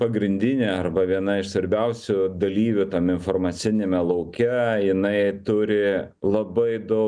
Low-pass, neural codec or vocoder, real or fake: 9.9 kHz; none; real